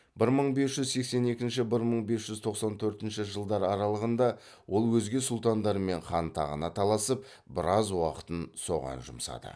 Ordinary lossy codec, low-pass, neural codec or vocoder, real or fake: none; none; none; real